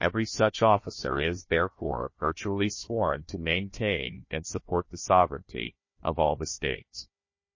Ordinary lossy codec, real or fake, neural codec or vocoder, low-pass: MP3, 32 kbps; fake; codec, 16 kHz, 1 kbps, FunCodec, trained on Chinese and English, 50 frames a second; 7.2 kHz